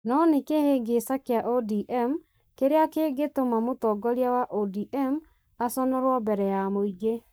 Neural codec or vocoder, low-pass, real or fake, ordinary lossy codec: codec, 44.1 kHz, 7.8 kbps, Pupu-Codec; none; fake; none